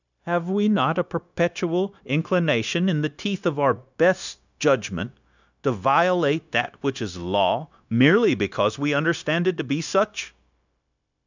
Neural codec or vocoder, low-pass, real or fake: codec, 16 kHz, 0.9 kbps, LongCat-Audio-Codec; 7.2 kHz; fake